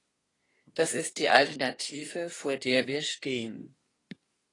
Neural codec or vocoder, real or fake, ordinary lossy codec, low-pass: codec, 24 kHz, 1 kbps, SNAC; fake; AAC, 32 kbps; 10.8 kHz